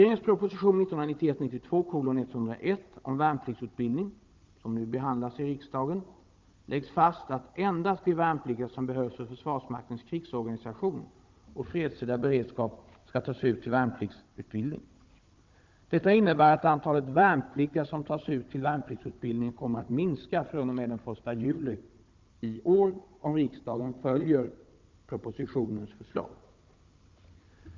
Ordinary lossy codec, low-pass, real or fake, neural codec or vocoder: Opus, 24 kbps; 7.2 kHz; fake; codec, 16 kHz, 16 kbps, FunCodec, trained on Chinese and English, 50 frames a second